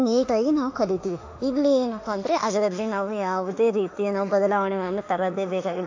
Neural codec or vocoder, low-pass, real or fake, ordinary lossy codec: autoencoder, 48 kHz, 32 numbers a frame, DAC-VAE, trained on Japanese speech; 7.2 kHz; fake; AAC, 48 kbps